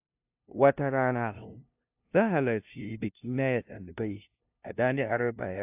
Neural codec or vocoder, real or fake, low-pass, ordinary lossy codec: codec, 16 kHz, 0.5 kbps, FunCodec, trained on LibriTTS, 25 frames a second; fake; 3.6 kHz; AAC, 32 kbps